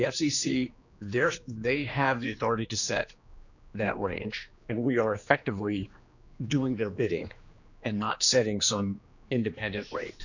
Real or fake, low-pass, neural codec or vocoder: fake; 7.2 kHz; codec, 16 kHz, 2 kbps, X-Codec, HuBERT features, trained on general audio